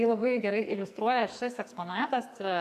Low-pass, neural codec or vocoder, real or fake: 14.4 kHz; codec, 44.1 kHz, 2.6 kbps, SNAC; fake